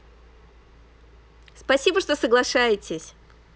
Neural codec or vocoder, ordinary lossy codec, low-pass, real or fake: none; none; none; real